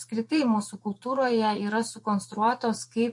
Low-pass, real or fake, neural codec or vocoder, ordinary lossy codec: 10.8 kHz; fake; vocoder, 24 kHz, 100 mel bands, Vocos; MP3, 48 kbps